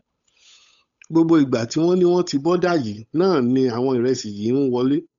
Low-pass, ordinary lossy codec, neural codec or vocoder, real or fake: 7.2 kHz; none; codec, 16 kHz, 8 kbps, FunCodec, trained on Chinese and English, 25 frames a second; fake